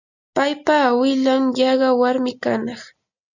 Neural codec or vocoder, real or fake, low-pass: none; real; 7.2 kHz